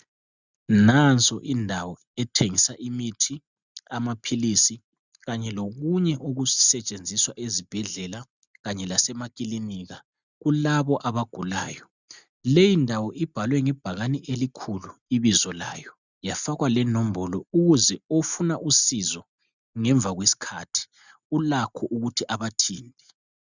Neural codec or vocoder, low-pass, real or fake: none; 7.2 kHz; real